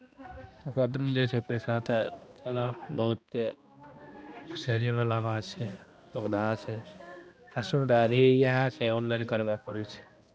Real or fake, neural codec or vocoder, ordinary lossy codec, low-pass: fake; codec, 16 kHz, 1 kbps, X-Codec, HuBERT features, trained on balanced general audio; none; none